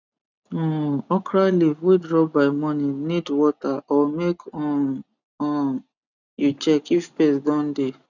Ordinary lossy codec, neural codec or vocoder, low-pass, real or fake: none; none; 7.2 kHz; real